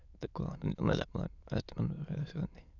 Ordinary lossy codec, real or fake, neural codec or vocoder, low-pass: none; fake; autoencoder, 22.05 kHz, a latent of 192 numbers a frame, VITS, trained on many speakers; 7.2 kHz